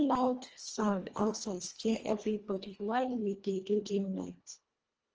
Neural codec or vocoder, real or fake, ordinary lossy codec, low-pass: codec, 24 kHz, 1.5 kbps, HILCodec; fake; Opus, 24 kbps; 7.2 kHz